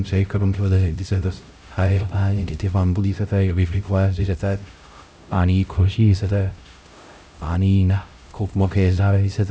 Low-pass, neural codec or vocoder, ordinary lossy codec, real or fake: none; codec, 16 kHz, 0.5 kbps, X-Codec, HuBERT features, trained on LibriSpeech; none; fake